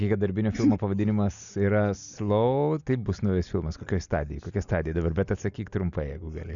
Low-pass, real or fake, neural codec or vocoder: 7.2 kHz; real; none